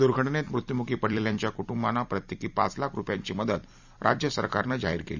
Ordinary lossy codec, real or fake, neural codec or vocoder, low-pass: none; fake; vocoder, 44.1 kHz, 128 mel bands every 256 samples, BigVGAN v2; 7.2 kHz